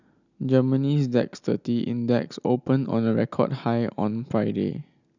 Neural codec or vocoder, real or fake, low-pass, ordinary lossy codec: none; real; 7.2 kHz; none